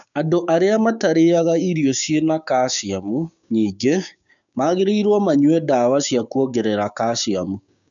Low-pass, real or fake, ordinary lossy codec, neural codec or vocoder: 7.2 kHz; fake; none; codec, 16 kHz, 6 kbps, DAC